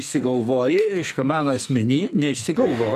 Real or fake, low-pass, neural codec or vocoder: fake; 14.4 kHz; codec, 44.1 kHz, 2.6 kbps, SNAC